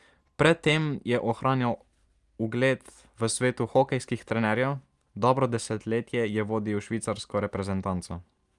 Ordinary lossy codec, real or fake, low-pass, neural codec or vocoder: Opus, 32 kbps; real; 10.8 kHz; none